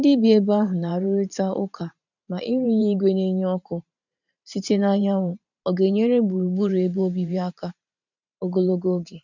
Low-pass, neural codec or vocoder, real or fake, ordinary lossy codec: 7.2 kHz; vocoder, 44.1 kHz, 128 mel bands every 512 samples, BigVGAN v2; fake; none